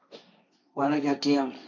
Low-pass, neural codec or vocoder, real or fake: 7.2 kHz; codec, 16 kHz, 1.1 kbps, Voila-Tokenizer; fake